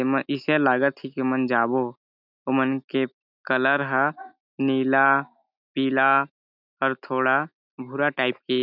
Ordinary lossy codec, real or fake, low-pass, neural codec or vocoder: none; real; 5.4 kHz; none